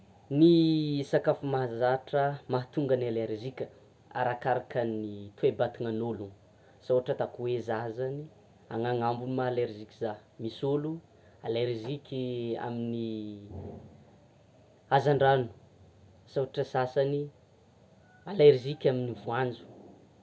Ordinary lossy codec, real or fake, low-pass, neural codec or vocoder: none; real; none; none